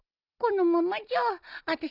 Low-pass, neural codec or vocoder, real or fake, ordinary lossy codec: 5.4 kHz; none; real; none